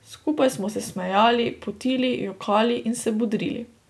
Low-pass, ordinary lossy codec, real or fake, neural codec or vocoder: none; none; real; none